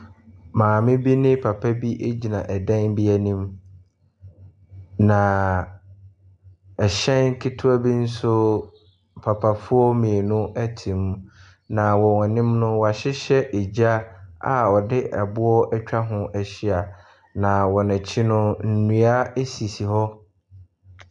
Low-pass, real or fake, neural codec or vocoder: 9.9 kHz; real; none